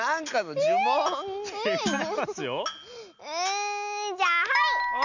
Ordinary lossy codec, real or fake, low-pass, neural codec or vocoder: none; real; 7.2 kHz; none